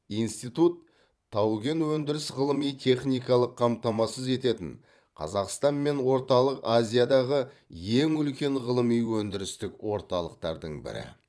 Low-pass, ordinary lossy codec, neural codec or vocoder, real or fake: none; none; vocoder, 22.05 kHz, 80 mel bands, Vocos; fake